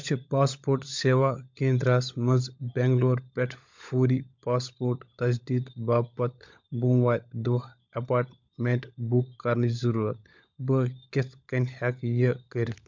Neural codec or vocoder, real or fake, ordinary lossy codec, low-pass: codec, 16 kHz, 16 kbps, FunCodec, trained on LibriTTS, 50 frames a second; fake; MP3, 64 kbps; 7.2 kHz